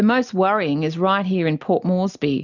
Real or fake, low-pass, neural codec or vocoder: real; 7.2 kHz; none